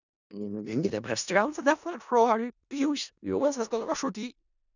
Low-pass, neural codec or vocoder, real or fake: 7.2 kHz; codec, 16 kHz in and 24 kHz out, 0.4 kbps, LongCat-Audio-Codec, four codebook decoder; fake